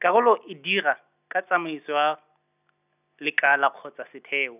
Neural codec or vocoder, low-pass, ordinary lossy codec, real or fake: none; 3.6 kHz; none; real